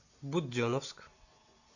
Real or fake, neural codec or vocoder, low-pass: real; none; 7.2 kHz